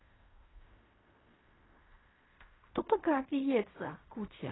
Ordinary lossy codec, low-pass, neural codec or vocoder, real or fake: AAC, 16 kbps; 7.2 kHz; codec, 16 kHz in and 24 kHz out, 0.4 kbps, LongCat-Audio-Codec, fine tuned four codebook decoder; fake